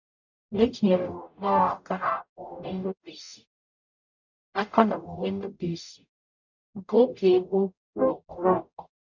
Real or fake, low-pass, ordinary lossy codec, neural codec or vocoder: fake; 7.2 kHz; none; codec, 44.1 kHz, 0.9 kbps, DAC